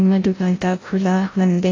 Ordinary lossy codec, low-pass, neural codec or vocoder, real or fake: AAC, 32 kbps; 7.2 kHz; codec, 16 kHz, 0.5 kbps, FreqCodec, larger model; fake